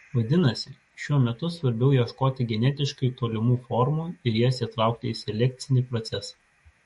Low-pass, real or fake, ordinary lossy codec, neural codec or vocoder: 19.8 kHz; fake; MP3, 48 kbps; autoencoder, 48 kHz, 128 numbers a frame, DAC-VAE, trained on Japanese speech